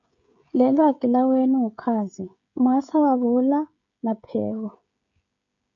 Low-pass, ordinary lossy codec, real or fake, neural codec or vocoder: 7.2 kHz; MP3, 96 kbps; fake; codec, 16 kHz, 16 kbps, FreqCodec, smaller model